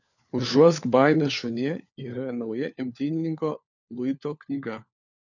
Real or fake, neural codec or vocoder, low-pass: fake; codec, 16 kHz, 4 kbps, FunCodec, trained on LibriTTS, 50 frames a second; 7.2 kHz